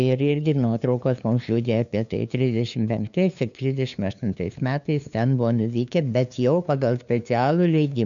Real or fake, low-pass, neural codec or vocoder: fake; 7.2 kHz; codec, 16 kHz, 2 kbps, FunCodec, trained on LibriTTS, 25 frames a second